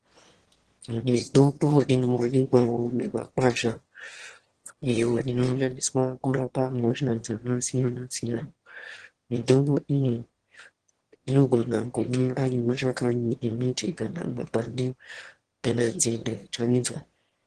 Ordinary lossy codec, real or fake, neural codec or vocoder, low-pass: Opus, 16 kbps; fake; autoencoder, 22.05 kHz, a latent of 192 numbers a frame, VITS, trained on one speaker; 9.9 kHz